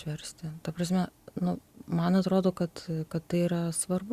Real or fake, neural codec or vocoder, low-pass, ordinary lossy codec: real; none; 14.4 kHz; Opus, 64 kbps